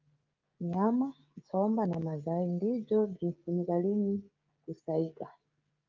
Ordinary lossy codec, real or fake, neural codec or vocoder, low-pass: Opus, 24 kbps; fake; codec, 16 kHz, 16 kbps, FreqCodec, smaller model; 7.2 kHz